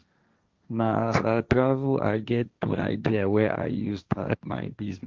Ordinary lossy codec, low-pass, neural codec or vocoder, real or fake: Opus, 32 kbps; 7.2 kHz; codec, 16 kHz, 1.1 kbps, Voila-Tokenizer; fake